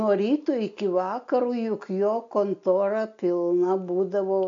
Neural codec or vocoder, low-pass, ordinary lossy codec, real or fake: none; 7.2 kHz; AAC, 64 kbps; real